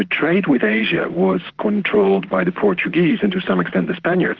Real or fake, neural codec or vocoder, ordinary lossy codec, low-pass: fake; vocoder, 44.1 kHz, 128 mel bands, Pupu-Vocoder; Opus, 16 kbps; 7.2 kHz